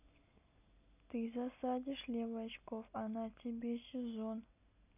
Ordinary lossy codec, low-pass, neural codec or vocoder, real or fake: none; 3.6 kHz; none; real